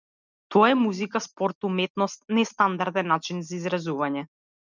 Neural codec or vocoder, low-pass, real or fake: none; 7.2 kHz; real